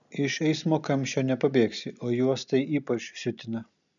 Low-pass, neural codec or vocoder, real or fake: 7.2 kHz; none; real